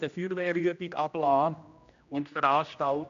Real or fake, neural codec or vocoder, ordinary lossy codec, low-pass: fake; codec, 16 kHz, 1 kbps, X-Codec, HuBERT features, trained on general audio; AAC, 64 kbps; 7.2 kHz